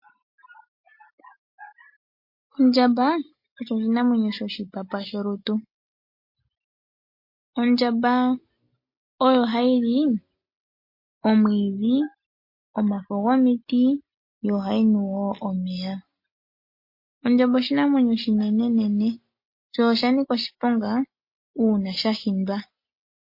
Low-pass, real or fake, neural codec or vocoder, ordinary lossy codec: 5.4 kHz; real; none; MP3, 32 kbps